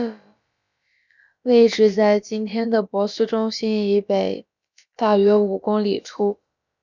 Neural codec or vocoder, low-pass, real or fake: codec, 16 kHz, about 1 kbps, DyCAST, with the encoder's durations; 7.2 kHz; fake